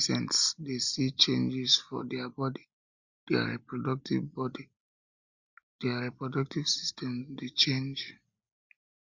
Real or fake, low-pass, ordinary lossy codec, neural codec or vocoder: real; 7.2 kHz; Opus, 64 kbps; none